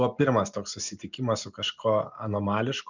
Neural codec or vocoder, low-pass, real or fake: none; 7.2 kHz; real